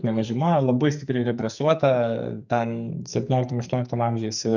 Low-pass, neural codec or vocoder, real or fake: 7.2 kHz; codec, 44.1 kHz, 2.6 kbps, SNAC; fake